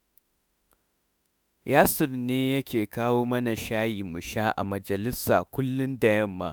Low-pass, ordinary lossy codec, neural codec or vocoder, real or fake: none; none; autoencoder, 48 kHz, 32 numbers a frame, DAC-VAE, trained on Japanese speech; fake